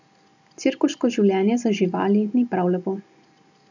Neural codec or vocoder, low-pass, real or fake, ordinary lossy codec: none; 7.2 kHz; real; none